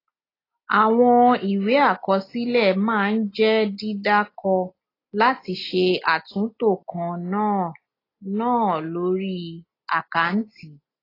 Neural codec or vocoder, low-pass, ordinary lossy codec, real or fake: none; 5.4 kHz; AAC, 24 kbps; real